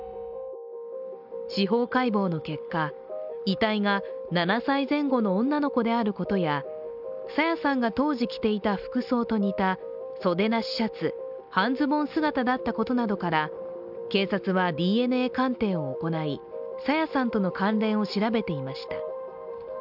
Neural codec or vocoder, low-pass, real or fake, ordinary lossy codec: none; 5.4 kHz; real; none